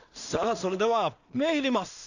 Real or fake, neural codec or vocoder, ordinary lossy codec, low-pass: fake; codec, 16 kHz in and 24 kHz out, 0.4 kbps, LongCat-Audio-Codec, two codebook decoder; none; 7.2 kHz